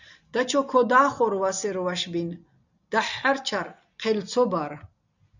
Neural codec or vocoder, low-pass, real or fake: none; 7.2 kHz; real